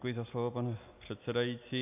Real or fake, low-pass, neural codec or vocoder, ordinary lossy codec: real; 3.6 kHz; none; AAC, 32 kbps